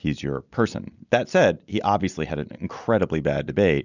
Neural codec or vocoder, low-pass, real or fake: none; 7.2 kHz; real